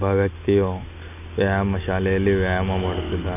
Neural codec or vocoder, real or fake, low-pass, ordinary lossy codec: none; real; 3.6 kHz; none